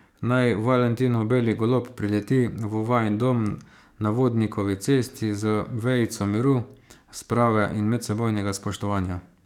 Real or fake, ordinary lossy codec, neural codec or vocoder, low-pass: fake; none; codec, 44.1 kHz, 7.8 kbps, DAC; 19.8 kHz